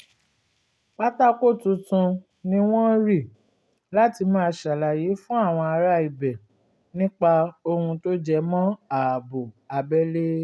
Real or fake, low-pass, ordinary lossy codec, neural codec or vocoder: real; none; none; none